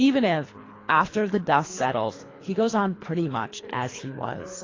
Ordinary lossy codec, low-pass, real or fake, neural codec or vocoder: AAC, 32 kbps; 7.2 kHz; fake; codec, 24 kHz, 3 kbps, HILCodec